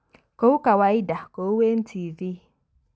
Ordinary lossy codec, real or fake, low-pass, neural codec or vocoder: none; real; none; none